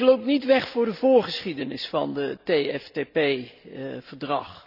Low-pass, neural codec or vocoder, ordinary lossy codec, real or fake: 5.4 kHz; none; none; real